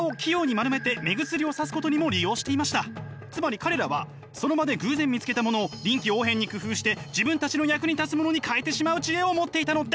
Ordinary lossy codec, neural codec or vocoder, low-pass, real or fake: none; none; none; real